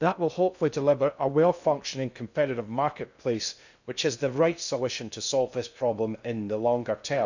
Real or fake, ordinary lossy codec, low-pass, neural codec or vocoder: fake; none; 7.2 kHz; codec, 16 kHz in and 24 kHz out, 0.6 kbps, FocalCodec, streaming, 2048 codes